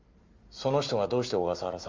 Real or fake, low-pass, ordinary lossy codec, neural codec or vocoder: real; 7.2 kHz; Opus, 32 kbps; none